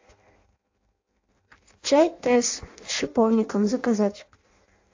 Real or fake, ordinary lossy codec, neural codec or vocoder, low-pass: fake; AAC, 48 kbps; codec, 16 kHz in and 24 kHz out, 0.6 kbps, FireRedTTS-2 codec; 7.2 kHz